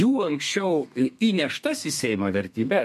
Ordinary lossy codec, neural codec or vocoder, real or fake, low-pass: MP3, 64 kbps; codec, 32 kHz, 1.9 kbps, SNAC; fake; 14.4 kHz